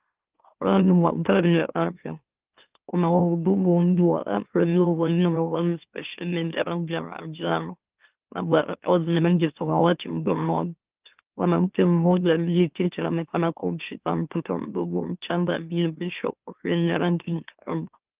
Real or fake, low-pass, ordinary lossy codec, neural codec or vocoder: fake; 3.6 kHz; Opus, 32 kbps; autoencoder, 44.1 kHz, a latent of 192 numbers a frame, MeloTTS